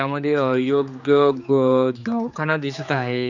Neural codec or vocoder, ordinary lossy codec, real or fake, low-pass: codec, 16 kHz, 4 kbps, X-Codec, HuBERT features, trained on general audio; none; fake; 7.2 kHz